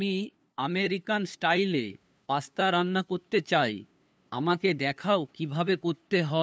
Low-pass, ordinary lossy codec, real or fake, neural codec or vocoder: none; none; fake; codec, 16 kHz, 2 kbps, FunCodec, trained on LibriTTS, 25 frames a second